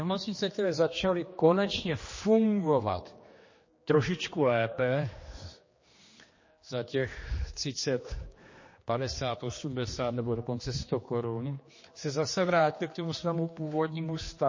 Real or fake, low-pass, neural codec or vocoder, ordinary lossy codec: fake; 7.2 kHz; codec, 16 kHz, 2 kbps, X-Codec, HuBERT features, trained on general audio; MP3, 32 kbps